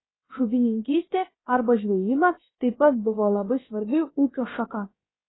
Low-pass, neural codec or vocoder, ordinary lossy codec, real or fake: 7.2 kHz; codec, 16 kHz, about 1 kbps, DyCAST, with the encoder's durations; AAC, 16 kbps; fake